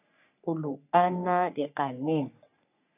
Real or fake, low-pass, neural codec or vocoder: fake; 3.6 kHz; codec, 44.1 kHz, 3.4 kbps, Pupu-Codec